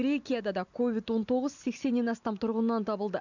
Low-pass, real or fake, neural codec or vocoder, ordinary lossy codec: 7.2 kHz; real; none; none